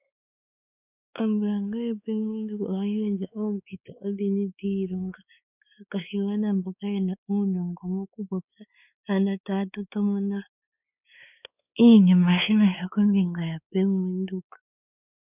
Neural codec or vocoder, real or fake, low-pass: codec, 16 kHz, 4 kbps, X-Codec, WavLM features, trained on Multilingual LibriSpeech; fake; 3.6 kHz